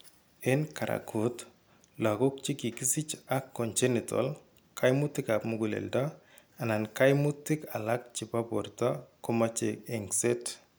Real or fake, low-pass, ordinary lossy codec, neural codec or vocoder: real; none; none; none